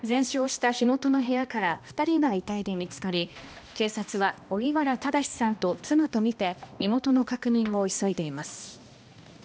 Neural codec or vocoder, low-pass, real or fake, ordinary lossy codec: codec, 16 kHz, 1 kbps, X-Codec, HuBERT features, trained on balanced general audio; none; fake; none